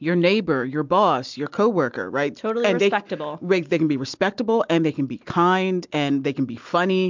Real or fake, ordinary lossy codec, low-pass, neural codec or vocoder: real; MP3, 64 kbps; 7.2 kHz; none